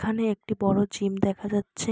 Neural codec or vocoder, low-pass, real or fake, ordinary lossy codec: none; none; real; none